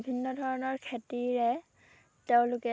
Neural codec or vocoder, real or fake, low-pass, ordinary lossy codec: none; real; none; none